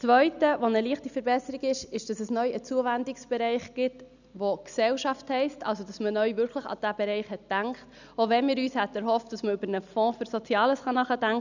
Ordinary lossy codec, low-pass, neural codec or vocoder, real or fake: none; 7.2 kHz; none; real